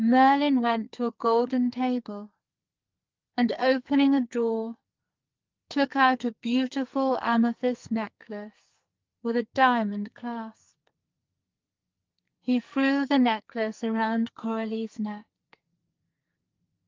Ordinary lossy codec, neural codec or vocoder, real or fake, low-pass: Opus, 24 kbps; codec, 44.1 kHz, 2.6 kbps, SNAC; fake; 7.2 kHz